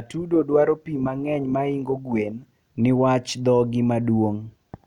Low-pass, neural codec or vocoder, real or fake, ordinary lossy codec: 19.8 kHz; none; real; none